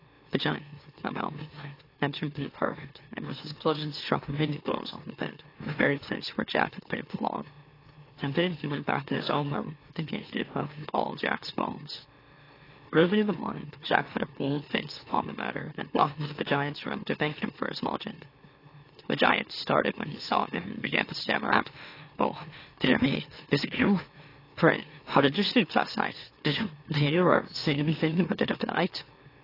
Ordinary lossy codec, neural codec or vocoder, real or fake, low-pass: AAC, 24 kbps; autoencoder, 44.1 kHz, a latent of 192 numbers a frame, MeloTTS; fake; 5.4 kHz